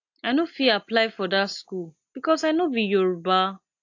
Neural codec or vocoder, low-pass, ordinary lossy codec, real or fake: none; 7.2 kHz; AAC, 48 kbps; real